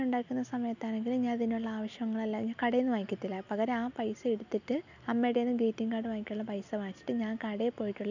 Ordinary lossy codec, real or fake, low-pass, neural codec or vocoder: none; real; 7.2 kHz; none